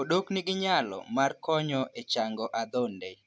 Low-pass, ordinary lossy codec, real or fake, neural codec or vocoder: none; none; real; none